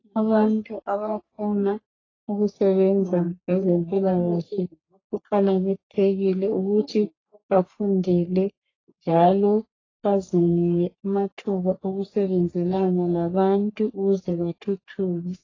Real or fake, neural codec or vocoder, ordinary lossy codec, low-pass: fake; codec, 44.1 kHz, 3.4 kbps, Pupu-Codec; AAC, 32 kbps; 7.2 kHz